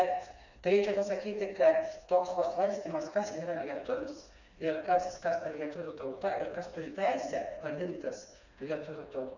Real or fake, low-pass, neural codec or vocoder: fake; 7.2 kHz; codec, 16 kHz, 2 kbps, FreqCodec, smaller model